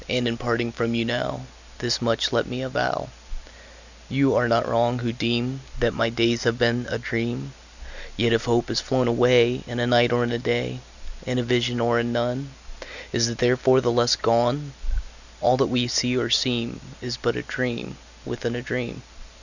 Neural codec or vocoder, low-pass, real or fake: none; 7.2 kHz; real